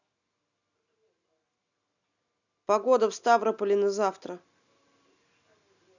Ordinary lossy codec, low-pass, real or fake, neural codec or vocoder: none; 7.2 kHz; real; none